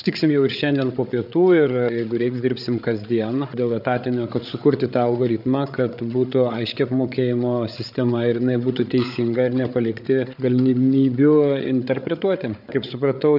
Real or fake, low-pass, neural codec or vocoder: fake; 5.4 kHz; codec, 16 kHz, 8 kbps, FreqCodec, larger model